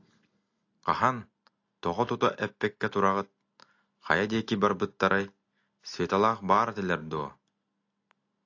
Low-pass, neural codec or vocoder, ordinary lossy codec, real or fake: 7.2 kHz; none; AAC, 48 kbps; real